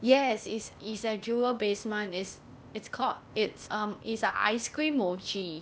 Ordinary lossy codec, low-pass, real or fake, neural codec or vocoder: none; none; fake; codec, 16 kHz, 0.8 kbps, ZipCodec